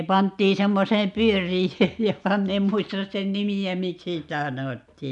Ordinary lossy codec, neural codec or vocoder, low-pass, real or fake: none; none; 10.8 kHz; real